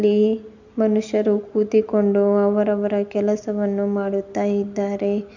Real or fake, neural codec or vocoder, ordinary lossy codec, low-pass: real; none; none; 7.2 kHz